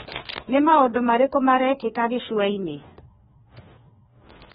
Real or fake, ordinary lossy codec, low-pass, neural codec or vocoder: fake; AAC, 16 kbps; 7.2 kHz; codec, 16 kHz, 2 kbps, FreqCodec, larger model